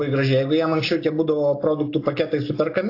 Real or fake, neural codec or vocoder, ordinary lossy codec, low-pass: real; none; AAC, 32 kbps; 5.4 kHz